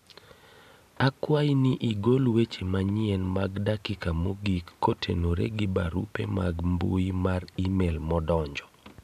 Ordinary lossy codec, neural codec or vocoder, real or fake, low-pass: none; none; real; 14.4 kHz